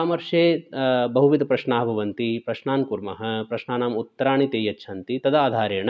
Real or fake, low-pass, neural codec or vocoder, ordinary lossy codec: real; none; none; none